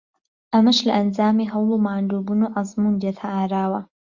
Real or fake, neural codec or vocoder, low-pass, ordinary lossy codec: real; none; 7.2 kHz; Opus, 64 kbps